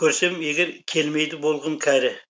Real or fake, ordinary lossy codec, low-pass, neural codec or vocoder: real; none; none; none